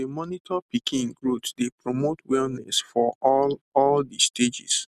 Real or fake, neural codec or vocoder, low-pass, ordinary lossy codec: real; none; 14.4 kHz; none